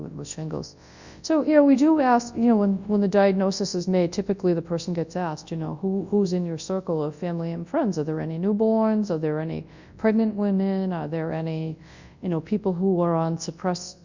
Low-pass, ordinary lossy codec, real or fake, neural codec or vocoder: 7.2 kHz; Opus, 64 kbps; fake; codec, 24 kHz, 0.9 kbps, WavTokenizer, large speech release